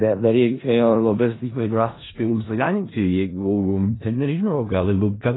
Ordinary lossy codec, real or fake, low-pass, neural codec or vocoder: AAC, 16 kbps; fake; 7.2 kHz; codec, 16 kHz in and 24 kHz out, 0.4 kbps, LongCat-Audio-Codec, four codebook decoder